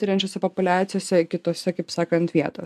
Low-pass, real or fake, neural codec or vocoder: 14.4 kHz; real; none